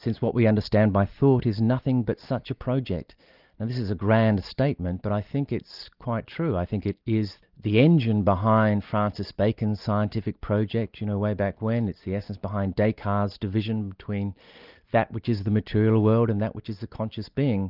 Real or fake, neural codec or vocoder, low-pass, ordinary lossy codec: real; none; 5.4 kHz; Opus, 24 kbps